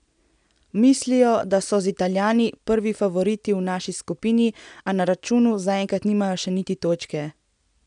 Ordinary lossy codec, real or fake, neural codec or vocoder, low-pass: none; real; none; 9.9 kHz